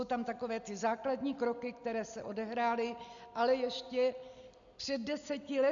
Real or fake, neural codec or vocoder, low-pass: real; none; 7.2 kHz